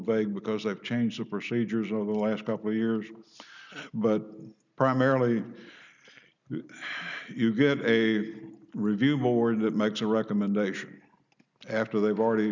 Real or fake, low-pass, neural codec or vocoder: real; 7.2 kHz; none